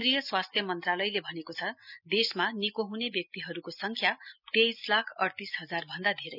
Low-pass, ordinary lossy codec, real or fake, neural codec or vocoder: 5.4 kHz; none; real; none